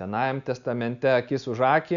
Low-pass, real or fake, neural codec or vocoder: 7.2 kHz; real; none